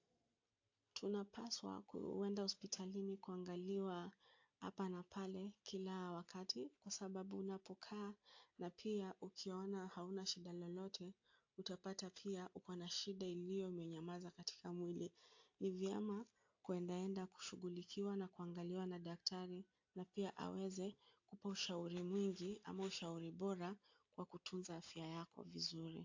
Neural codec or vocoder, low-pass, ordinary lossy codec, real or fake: none; 7.2 kHz; AAC, 48 kbps; real